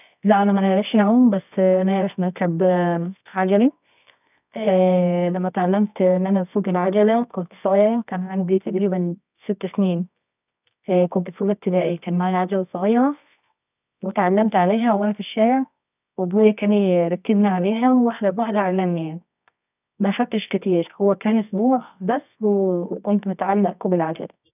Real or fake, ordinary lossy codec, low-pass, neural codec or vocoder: fake; none; 3.6 kHz; codec, 24 kHz, 0.9 kbps, WavTokenizer, medium music audio release